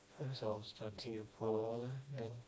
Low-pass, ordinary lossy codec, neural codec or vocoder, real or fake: none; none; codec, 16 kHz, 1 kbps, FreqCodec, smaller model; fake